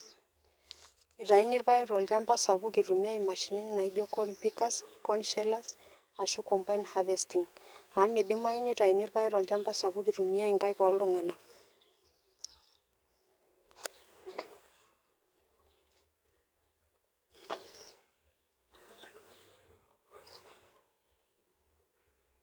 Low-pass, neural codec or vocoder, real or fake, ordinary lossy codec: none; codec, 44.1 kHz, 2.6 kbps, SNAC; fake; none